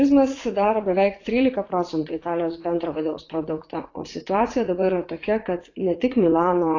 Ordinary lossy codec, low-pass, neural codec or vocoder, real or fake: AAC, 32 kbps; 7.2 kHz; vocoder, 44.1 kHz, 80 mel bands, Vocos; fake